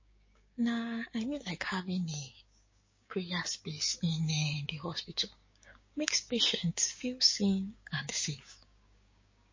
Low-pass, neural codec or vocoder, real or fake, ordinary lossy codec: 7.2 kHz; codec, 16 kHz, 6 kbps, DAC; fake; MP3, 32 kbps